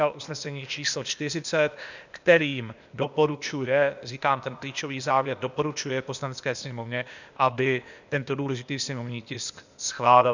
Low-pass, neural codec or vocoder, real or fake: 7.2 kHz; codec, 16 kHz, 0.8 kbps, ZipCodec; fake